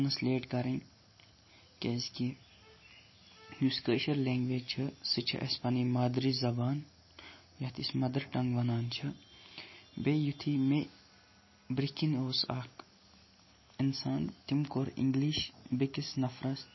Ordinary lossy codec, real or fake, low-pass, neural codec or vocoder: MP3, 24 kbps; real; 7.2 kHz; none